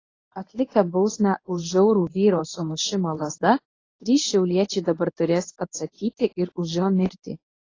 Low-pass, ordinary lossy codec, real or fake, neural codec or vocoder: 7.2 kHz; AAC, 32 kbps; fake; codec, 24 kHz, 0.9 kbps, WavTokenizer, medium speech release version 1